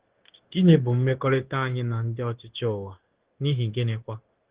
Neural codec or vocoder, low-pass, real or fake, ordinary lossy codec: codec, 16 kHz, 0.9 kbps, LongCat-Audio-Codec; 3.6 kHz; fake; Opus, 16 kbps